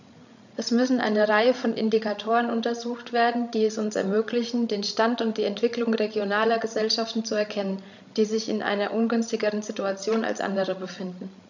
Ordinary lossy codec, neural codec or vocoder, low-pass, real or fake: none; codec, 16 kHz, 16 kbps, FreqCodec, larger model; 7.2 kHz; fake